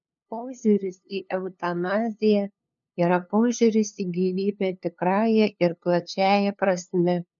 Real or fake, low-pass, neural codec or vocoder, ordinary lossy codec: fake; 7.2 kHz; codec, 16 kHz, 2 kbps, FunCodec, trained on LibriTTS, 25 frames a second; MP3, 96 kbps